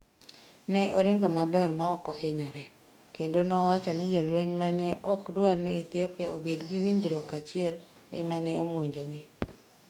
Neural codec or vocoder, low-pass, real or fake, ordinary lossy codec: codec, 44.1 kHz, 2.6 kbps, DAC; 19.8 kHz; fake; none